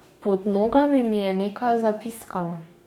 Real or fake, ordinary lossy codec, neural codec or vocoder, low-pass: fake; none; codec, 44.1 kHz, 2.6 kbps, DAC; 19.8 kHz